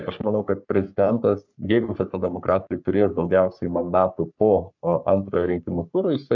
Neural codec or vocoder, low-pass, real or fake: codec, 44.1 kHz, 3.4 kbps, Pupu-Codec; 7.2 kHz; fake